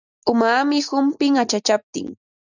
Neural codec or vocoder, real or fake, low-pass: none; real; 7.2 kHz